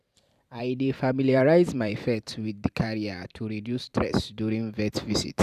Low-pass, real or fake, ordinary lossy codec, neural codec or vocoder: 14.4 kHz; fake; none; vocoder, 44.1 kHz, 128 mel bands every 512 samples, BigVGAN v2